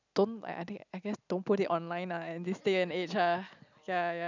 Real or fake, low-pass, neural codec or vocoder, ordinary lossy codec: real; 7.2 kHz; none; none